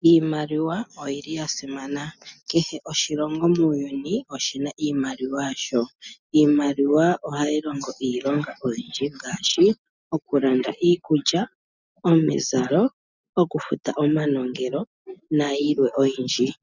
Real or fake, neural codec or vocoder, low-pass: real; none; 7.2 kHz